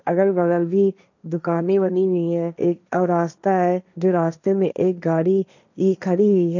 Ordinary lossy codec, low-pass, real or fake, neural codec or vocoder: none; 7.2 kHz; fake; codec, 16 kHz, 1.1 kbps, Voila-Tokenizer